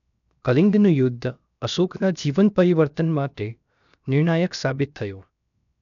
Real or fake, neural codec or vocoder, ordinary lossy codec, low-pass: fake; codec, 16 kHz, 0.7 kbps, FocalCodec; none; 7.2 kHz